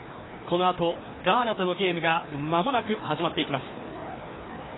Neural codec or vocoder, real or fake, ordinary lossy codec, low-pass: codec, 16 kHz, 2 kbps, FreqCodec, larger model; fake; AAC, 16 kbps; 7.2 kHz